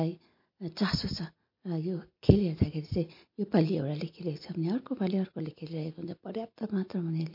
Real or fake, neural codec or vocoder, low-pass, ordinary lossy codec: real; none; 5.4 kHz; MP3, 32 kbps